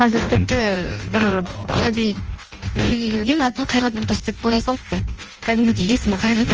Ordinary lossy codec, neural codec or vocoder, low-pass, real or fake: Opus, 24 kbps; codec, 16 kHz in and 24 kHz out, 0.6 kbps, FireRedTTS-2 codec; 7.2 kHz; fake